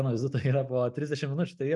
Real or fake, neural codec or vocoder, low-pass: real; none; 10.8 kHz